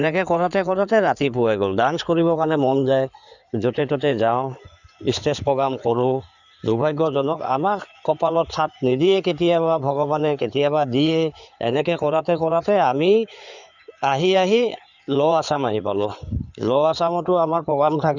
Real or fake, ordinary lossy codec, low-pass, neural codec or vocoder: fake; none; 7.2 kHz; codec, 16 kHz in and 24 kHz out, 2.2 kbps, FireRedTTS-2 codec